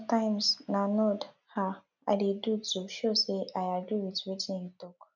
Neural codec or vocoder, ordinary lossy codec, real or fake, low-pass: none; none; real; 7.2 kHz